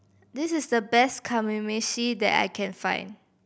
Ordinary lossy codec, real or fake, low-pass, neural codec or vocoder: none; real; none; none